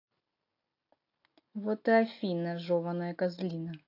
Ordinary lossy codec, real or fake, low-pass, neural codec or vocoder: AAC, 32 kbps; real; 5.4 kHz; none